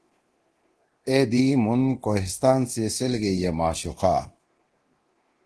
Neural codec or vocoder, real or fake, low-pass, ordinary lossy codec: codec, 24 kHz, 0.9 kbps, DualCodec; fake; 10.8 kHz; Opus, 16 kbps